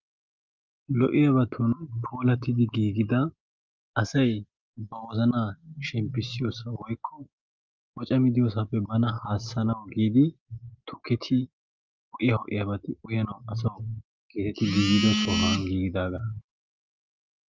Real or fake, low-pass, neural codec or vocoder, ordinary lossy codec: real; 7.2 kHz; none; Opus, 24 kbps